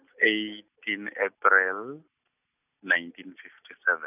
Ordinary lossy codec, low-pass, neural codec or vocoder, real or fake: none; 3.6 kHz; none; real